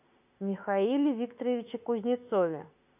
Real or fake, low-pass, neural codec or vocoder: fake; 3.6 kHz; autoencoder, 48 kHz, 32 numbers a frame, DAC-VAE, trained on Japanese speech